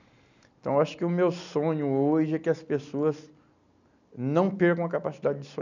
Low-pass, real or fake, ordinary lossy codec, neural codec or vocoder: 7.2 kHz; real; none; none